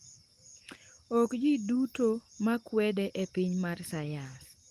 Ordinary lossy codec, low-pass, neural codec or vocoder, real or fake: Opus, 24 kbps; 14.4 kHz; none; real